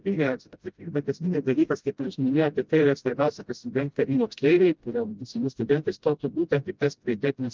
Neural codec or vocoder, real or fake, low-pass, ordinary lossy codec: codec, 16 kHz, 0.5 kbps, FreqCodec, smaller model; fake; 7.2 kHz; Opus, 24 kbps